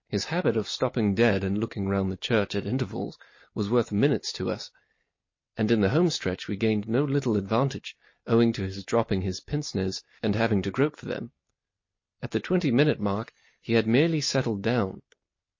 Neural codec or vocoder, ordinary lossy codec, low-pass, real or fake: none; MP3, 32 kbps; 7.2 kHz; real